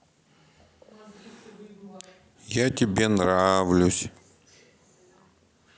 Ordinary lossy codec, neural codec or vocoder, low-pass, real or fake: none; none; none; real